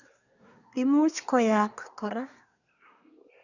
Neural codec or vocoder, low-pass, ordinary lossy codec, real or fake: codec, 24 kHz, 1 kbps, SNAC; 7.2 kHz; none; fake